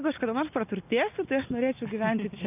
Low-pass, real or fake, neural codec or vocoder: 3.6 kHz; real; none